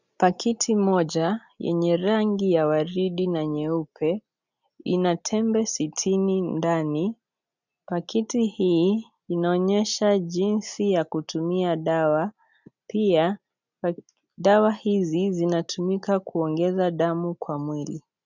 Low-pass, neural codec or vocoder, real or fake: 7.2 kHz; none; real